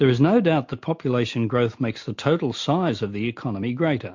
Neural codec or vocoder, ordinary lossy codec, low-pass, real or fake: none; MP3, 48 kbps; 7.2 kHz; real